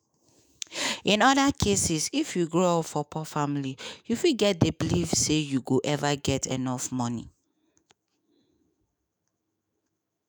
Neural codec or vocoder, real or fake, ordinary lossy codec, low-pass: autoencoder, 48 kHz, 128 numbers a frame, DAC-VAE, trained on Japanese speech; fake; none; none